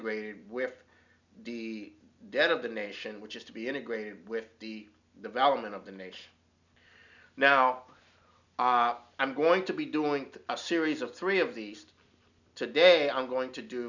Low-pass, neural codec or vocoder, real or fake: 7.2 kHz; none; real